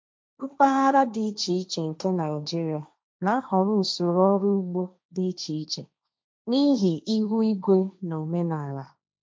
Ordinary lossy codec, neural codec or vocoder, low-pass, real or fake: none; codec, 16 kHz, 1.1 kbps, Voila-Tokenizer; none; fake